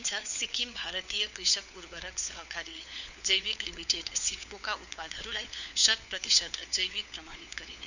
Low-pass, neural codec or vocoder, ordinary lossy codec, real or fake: 7.2 kHz; codec, 16 kHz, 4 kbps, FunCodec, trained on LibriTTS, 50 frames a second; none; fake